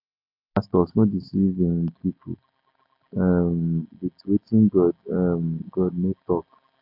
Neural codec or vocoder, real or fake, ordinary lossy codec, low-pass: none; real; AAC, 32 kbps; 5.4 kHz